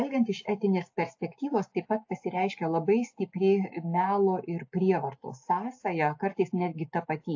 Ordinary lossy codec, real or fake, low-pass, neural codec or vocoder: AAC, 48 kbps; real; 7.2 kHz; none